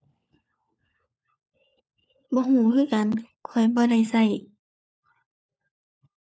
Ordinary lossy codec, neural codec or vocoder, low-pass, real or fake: none; codec, 16 kHz, 4 kbps, FunCodec, trained on LibriTTS, 50 frames a second; none; fake